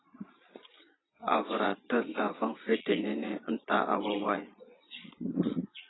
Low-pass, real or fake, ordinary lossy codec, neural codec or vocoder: 7.2 kHz; fake; AAC, 16 kbps; vocoder, 22.05 kHz, 80 mel bands, Vocos